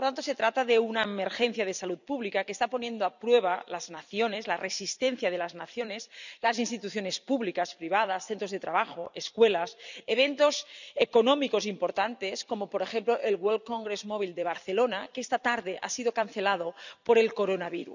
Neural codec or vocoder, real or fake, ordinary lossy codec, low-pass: vocoder, 44.1 kHz, 128 mel bands every 256 samples, BigVGAN v2; fake; none; 7.2 kHz